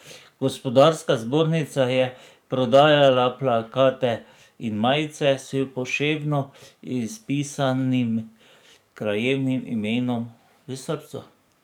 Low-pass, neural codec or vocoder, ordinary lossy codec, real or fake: 19.8 kHz; codec, 44.1 kHz, 7.8 kbps, DAC; none; fake